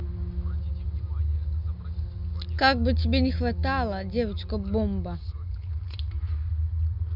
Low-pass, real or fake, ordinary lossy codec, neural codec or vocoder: 5.4 kHz; real; none; none